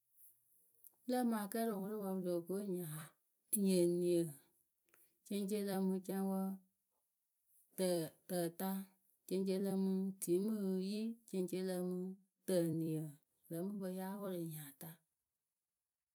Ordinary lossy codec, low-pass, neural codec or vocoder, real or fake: none; none; vocoder, 44.1 kHz, 128 mel bands every 256 samples, BigVGAN v2; fake